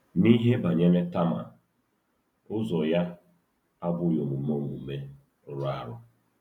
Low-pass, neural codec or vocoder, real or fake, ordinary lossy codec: 19.8 kHz; none; real; none